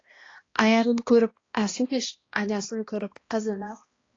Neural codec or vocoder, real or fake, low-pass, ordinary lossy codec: codec, 16 kHz, 1 kbps, X-Codec, HuBERT features, trained on balanced general audio; fake; 7.2 kHz; AAC, 32 kbps